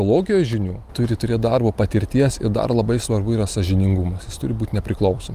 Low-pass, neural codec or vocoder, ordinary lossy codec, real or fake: 14.4 kHz; none; Opus, 32 kbps; real